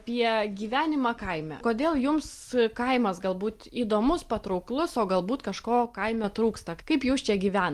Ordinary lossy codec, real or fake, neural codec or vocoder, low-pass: Opus, 24 kbps; real; none; 14.4 kHz